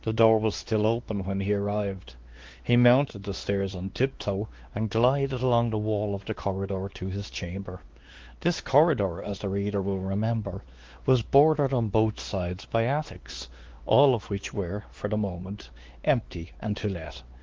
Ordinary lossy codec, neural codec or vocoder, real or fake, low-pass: Opus, 16 kbps; codec, 16 kHz, 2 kbps, X-Codec, WavLM features, trained on Multilingual LibriSpeech; fake; 7.2 kHz